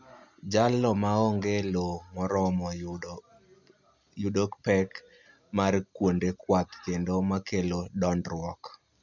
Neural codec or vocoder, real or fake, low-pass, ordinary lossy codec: none; real; 7.2 kHz; none